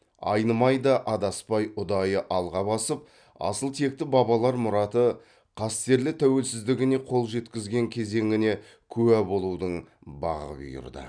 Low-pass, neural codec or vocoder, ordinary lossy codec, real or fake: 9.9 kHz; none; none; real